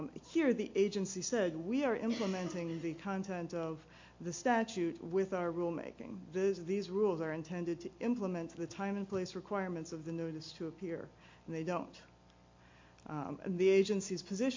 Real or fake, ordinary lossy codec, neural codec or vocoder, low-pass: real; MP3, 48 kbps; none; 7.2 kHz